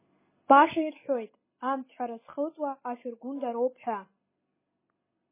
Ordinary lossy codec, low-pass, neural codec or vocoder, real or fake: MP3, 16 kbps; 3.6 kHz; none; real